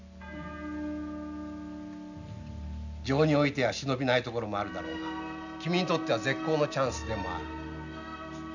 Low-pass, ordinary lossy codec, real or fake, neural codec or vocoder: 7.2 kHz; none; real; none